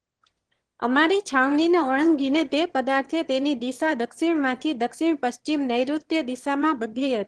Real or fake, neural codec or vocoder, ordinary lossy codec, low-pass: fake; autoencoder, 22.05 kHz, a latent of 192 numbers a frame, VITS, trained on one speaker; Opus, 16 kbps; 9.9 kHz